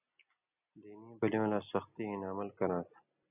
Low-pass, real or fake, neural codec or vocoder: 3.6 kHz; real; none